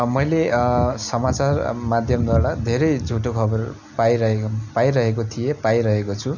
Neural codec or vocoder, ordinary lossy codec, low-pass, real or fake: none; none; 7.2 kHz; real